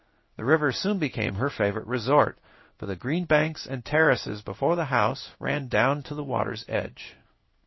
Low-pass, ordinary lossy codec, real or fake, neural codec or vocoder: 7.2 kHz; MP3, 24 kbps; fake; codec, 16 kHz in and 24 kHz out, 1 kbps, XY-Tokenizer